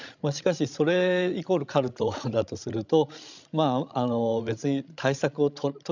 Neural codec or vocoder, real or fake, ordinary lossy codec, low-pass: codec, 16 kHz, 16 kbps, FreqCodec, larger model; fake; none; 7.2 kHz